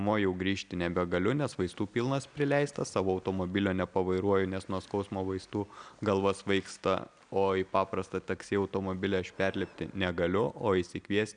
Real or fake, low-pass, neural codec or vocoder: real; 9.9 kHz; none